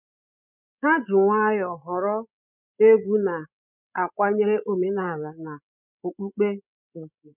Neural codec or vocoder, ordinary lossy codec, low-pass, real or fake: codec, 16 kHz, 16 kbps, FreqCodec, larger model; none; 3.6 kHz; fake